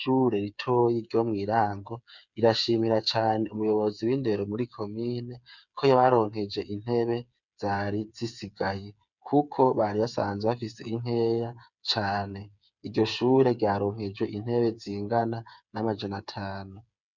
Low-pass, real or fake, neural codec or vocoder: 7.2 kHz; fake; codec, 16 kHz, 8 kbps, FreqCodec, smaller model